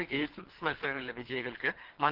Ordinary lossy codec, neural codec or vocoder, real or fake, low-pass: Opus, 16 kbps; codec, 24 kHz, 3 kbps, HILCodec; fake; 5.4 kHz